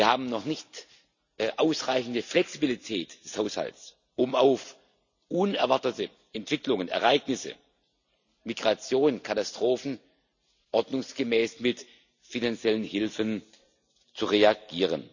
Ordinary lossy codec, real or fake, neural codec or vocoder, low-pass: none; real; none; 7.2 kHz